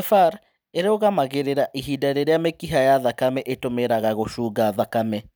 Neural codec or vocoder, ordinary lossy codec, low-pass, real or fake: none; none; none; real